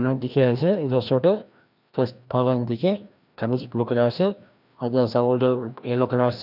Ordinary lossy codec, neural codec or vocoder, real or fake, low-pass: none; codec, 16 kHz, 1 kbps, FreqCodec, larger model; fake; 5.4 kHz